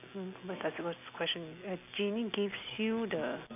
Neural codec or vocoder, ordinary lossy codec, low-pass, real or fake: none; none; 3.6 kHz; real